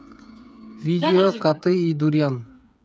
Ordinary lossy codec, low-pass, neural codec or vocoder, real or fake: none; none; codec, 16 kHz, 8 kbps, FreqCodec, smaller model; fake